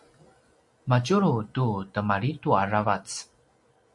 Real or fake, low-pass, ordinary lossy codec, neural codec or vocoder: real; 10.8 kHz; MP3, 48 kbps; none